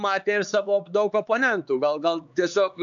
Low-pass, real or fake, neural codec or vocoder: 7.2 kHz; fake; codec, 16 kHz, 4 kbps, X-Codec, HuBERT features, trained on LibriSpeech